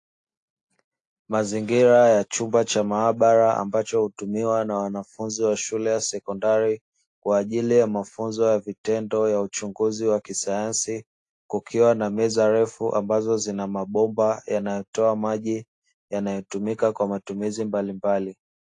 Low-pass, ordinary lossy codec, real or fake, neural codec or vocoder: 10.8 kHz; AAC, 48 kbps; real; none